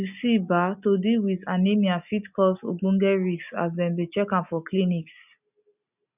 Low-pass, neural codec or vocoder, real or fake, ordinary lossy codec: 3.6 kHz; none; real; none